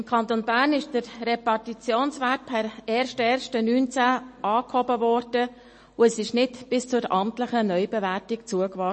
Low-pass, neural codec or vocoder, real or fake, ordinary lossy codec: 10.8 kHz; none; real; MP3, 32 kbps